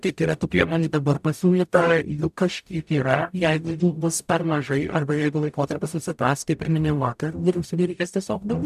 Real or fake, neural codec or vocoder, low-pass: fake; codec, 44.1 kHz, 0.9 kbps, DAC; 14.4 kHz